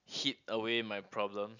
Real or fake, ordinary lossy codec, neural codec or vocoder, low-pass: real; none; none; 7.2 kHz